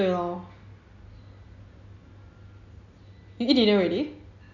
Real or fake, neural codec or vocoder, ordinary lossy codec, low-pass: real; none; none; 7.2 kHz